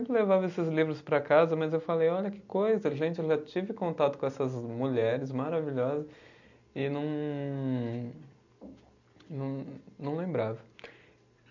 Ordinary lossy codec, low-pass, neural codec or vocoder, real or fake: none; 7.2 kHz; none; real